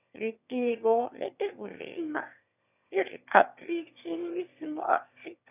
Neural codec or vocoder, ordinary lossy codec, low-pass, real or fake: autoencoder, 22.05 kHz, a latent of 192 numbers a frame, VITS, trained on one speaker; none; 3.6 kHz; fake